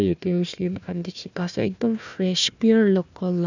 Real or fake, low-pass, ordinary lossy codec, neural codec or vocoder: fake; 7.2 kHz; none; codec, 16 kHz, 1 kbps, FunCodec, trained on Chinese and English, 50 frames a second